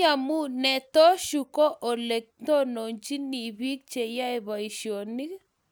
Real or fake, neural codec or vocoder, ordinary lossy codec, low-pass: real; none; none; none